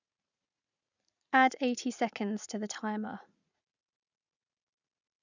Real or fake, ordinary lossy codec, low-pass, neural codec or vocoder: fake; none; 7.2 kHz; vocoder, 44.1 kHz, 80 mel bands, Vocos